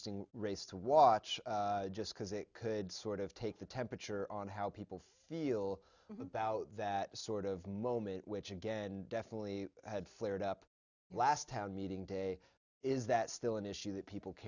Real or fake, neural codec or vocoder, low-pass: real; none; 7.2 kHz